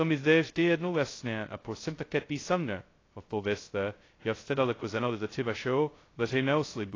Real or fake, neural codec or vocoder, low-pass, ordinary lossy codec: fake; codec, 16 kHz, 0.2 kbps, FocalCodec; 7.2 kHz; AAC, 32 kbps